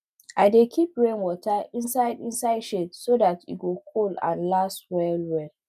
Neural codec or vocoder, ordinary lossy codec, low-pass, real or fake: none; none; 14.4 kHz; real